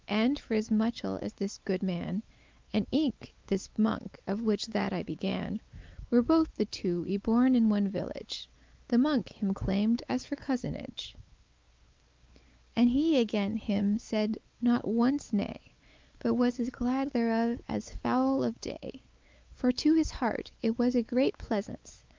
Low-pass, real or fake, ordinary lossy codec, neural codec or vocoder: 7.2 kHz; fake; Opus, 24 kbps; codec, 16 kHz, 4 kbps, X-Codec, WavLM features, trained on Multilingual LibriSpeech